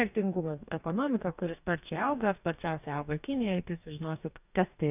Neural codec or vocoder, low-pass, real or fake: codec, 44.1 kHz, 2.6 kbps, DAC; 3.6 kHz; fake